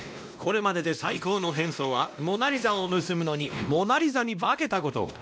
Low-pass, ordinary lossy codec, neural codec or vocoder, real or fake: none; none; codec, 16 kHz, 1 kbps, X-Codec, WavLM features, trained on Multilingual LibriSpeech; fake